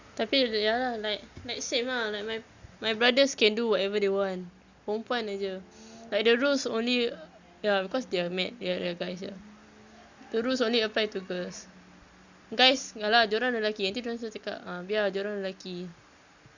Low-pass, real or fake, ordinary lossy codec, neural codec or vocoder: 7.2 kHz; real; Opus, 64 kbps; none